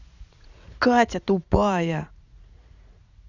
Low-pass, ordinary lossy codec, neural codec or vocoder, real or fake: 7.2 kHz; none; vocoder, 44.1 kHz, 80 mel bands, Vocos; fake